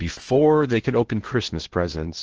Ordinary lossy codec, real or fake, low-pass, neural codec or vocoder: Opus, 16 kbps; fake; 7.2 kHz; codec, 16 kHz in and 24 kHz out, 0.8 kbps, FocalCodec, streaming, 65536 codes